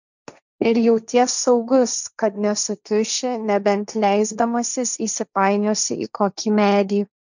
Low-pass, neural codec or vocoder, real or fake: 7.2 kHz; codec, 16 kHz, 1.1 kbps, Voila-Tokenizer; fake